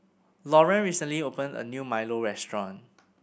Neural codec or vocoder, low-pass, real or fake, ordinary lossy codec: none; none; real; none